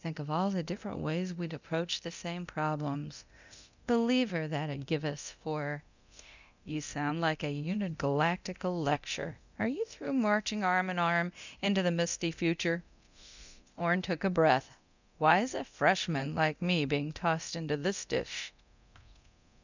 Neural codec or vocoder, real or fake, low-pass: codec, 24 kHz, 0.9 kbps, DualCodec; fake; 7.2 kHz